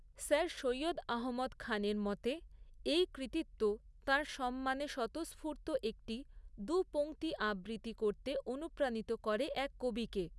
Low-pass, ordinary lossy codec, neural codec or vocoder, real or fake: none; none; none; real